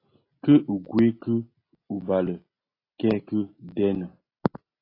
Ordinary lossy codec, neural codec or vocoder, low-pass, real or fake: AAC, 24 kbps; none; 5.4 kHz; real